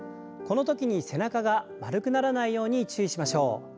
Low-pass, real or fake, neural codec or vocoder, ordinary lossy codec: none; real; none; none